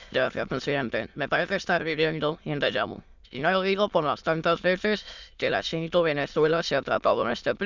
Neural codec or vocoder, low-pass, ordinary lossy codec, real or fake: autoencoder, 22.05 kHz, a latent of 192 numbers a frame, VITS, trained on many speakers; 7.2 kHz; Opus, 64 kbps; fake